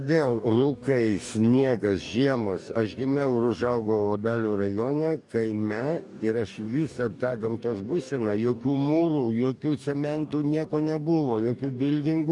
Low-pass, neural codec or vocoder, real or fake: 10.8 kHz; codec, 44.1 kHz, 2.6 kbps, DAC; fake